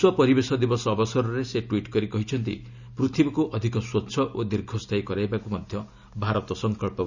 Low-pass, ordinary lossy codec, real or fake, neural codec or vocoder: 7.2 kHz; none; real; none